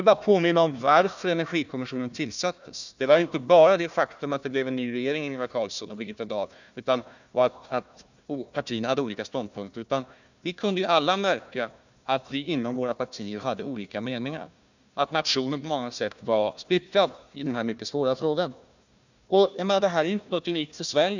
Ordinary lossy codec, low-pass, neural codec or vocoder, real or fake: none; 7.2 kHz; codec, 16 kHz, 1 kbps, FunCodec, trained on Chinese and English, 50 frames a second; fake